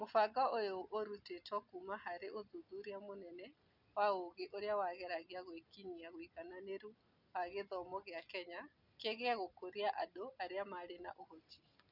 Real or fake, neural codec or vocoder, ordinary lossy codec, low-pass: real; none; none; 5.4 kHz